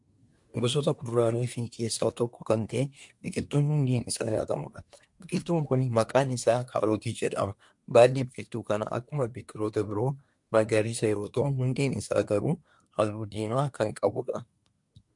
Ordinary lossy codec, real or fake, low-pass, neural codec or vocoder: MP3, 64 kbps; fake; 10.8 kHz; codec, 24 kHz, 1 kbps, SNAC